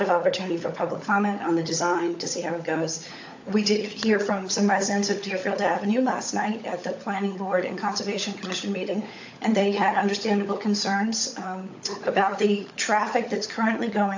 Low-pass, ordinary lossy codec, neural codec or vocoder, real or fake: 7.2 kHz; AAC, 48 kbps; codec, 16 kHz, 16 kbps, FunCodec, trained on LibriTTS, 50 frames a second; fake